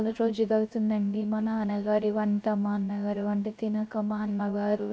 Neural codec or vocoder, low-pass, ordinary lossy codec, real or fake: codec, 16 kHz, 0.3 kbps, FocalCodec; none; none; fake